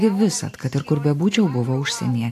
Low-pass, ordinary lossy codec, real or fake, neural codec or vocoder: 14.4 kHz; AAC, 64 kbps; real; none